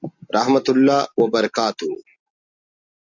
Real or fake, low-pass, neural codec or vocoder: real; 7.2 kHz; none